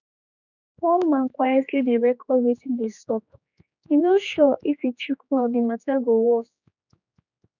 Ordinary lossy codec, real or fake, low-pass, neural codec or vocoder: none; fake; 7.2 kHz; codec, 16 kHz, 4 kbps, X-Codec, HuBERT features, trained on general audio